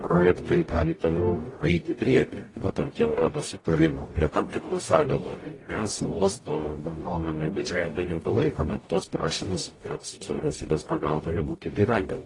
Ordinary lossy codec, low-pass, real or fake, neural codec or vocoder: AAC, 32 kbps; 10.8 kHz; fake; codec, 44.1 kHz, 0.9 kbps, DAC